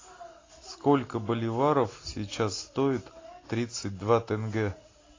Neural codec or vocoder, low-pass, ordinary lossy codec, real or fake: none; 7.2 kHz; AAC, 32 kbps; real